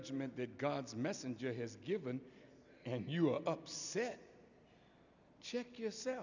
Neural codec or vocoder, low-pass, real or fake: none; 7.2 kHz; real